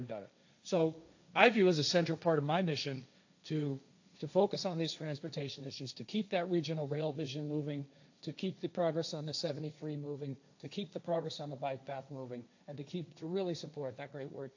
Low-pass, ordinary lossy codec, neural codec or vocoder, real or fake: 7.2 kHz; AAC, 48 kbps; codec, 16 kHz, 1.1 kbps, Voila-Tokenizer; fake